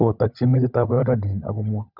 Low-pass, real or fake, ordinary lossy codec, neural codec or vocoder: 5.4 kHz; fake; none; codec, 16 kHz, 16 kbps, FunCodec, trained on LibriTTS, 50 frames a second